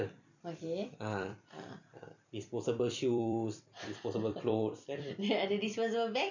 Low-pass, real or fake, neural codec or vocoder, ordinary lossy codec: 7.2 kHz; fake; vocoder, 44.1 kHz, 128 mel bands every 256 samples, BigVGAN v2; none